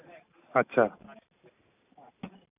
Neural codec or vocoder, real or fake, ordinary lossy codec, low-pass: none; real; none; 3.6 kHz